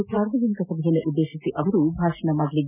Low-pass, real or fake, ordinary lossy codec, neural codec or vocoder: 3.6 kHz; real; none; none